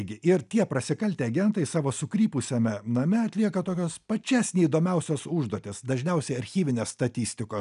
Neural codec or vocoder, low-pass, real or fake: none; 10.8 kHz; real